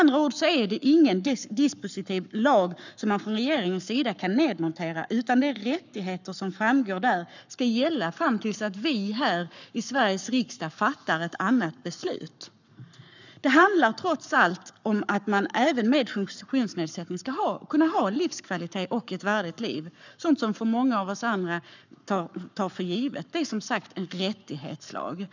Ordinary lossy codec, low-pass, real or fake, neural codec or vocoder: none; 7.2 kHz; fake; codec, 44.1 kHz, 7.8 kbps, Pupu-Codec